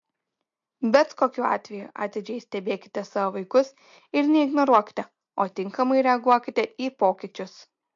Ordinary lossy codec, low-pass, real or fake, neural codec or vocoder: MP3, 48 kbps; 7.2 kHz; real; none